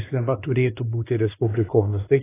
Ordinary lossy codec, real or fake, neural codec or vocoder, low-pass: AAC, 16 kbps; fake; codec, 16 kHz, 2 kbps, X-Codec, WavLM features, trained on Multilingual LibriSpeech; 3.6 kHz